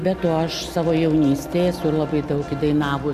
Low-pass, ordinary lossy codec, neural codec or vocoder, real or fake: 14.4 kHz; Opus, 64 kbps; none; real